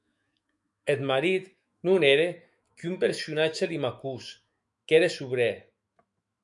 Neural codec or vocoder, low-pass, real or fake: autoencoder, 48 kHz, 128 numbers a frame, DAC-VAE, trained on Japanese speech; 10.8 kHz; fake